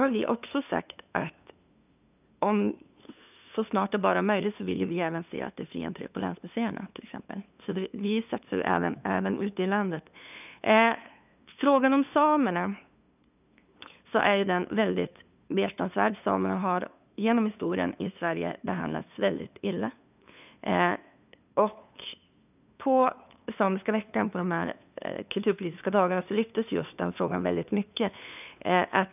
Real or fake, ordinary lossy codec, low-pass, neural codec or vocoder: fake; none; 3.6 kHz; codec, 16 kHz, 2 kbps, FunCodec, trained on LibriTTS, 25 frames a second